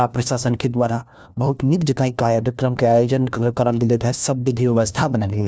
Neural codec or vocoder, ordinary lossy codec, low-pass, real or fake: codec, 16 kHz, 1 kbps, FunCodec, trained on LibriTTS, 50 frames a second; none; none; fake